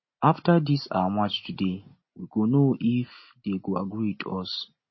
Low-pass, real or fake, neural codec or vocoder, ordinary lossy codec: 7.2 kHz; real; none; MP3, 24 kbps